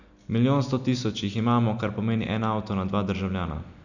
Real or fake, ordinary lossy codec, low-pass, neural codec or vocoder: real; none; 7.2 kHz; none